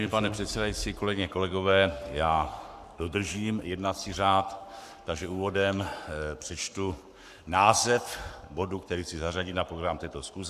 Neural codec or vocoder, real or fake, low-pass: codec, 44.1 kHz, 7.8 kbps, Pupu-Codec; fake; 14.4 kHz